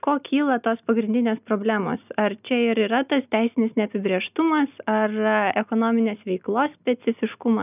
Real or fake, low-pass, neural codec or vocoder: real; 3.6 kHz; none